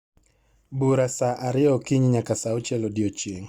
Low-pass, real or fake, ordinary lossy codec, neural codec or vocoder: 19.8 kHz; real; Opus, 64 kbps; none